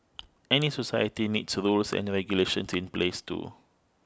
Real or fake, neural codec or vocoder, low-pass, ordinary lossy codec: real; none; none; none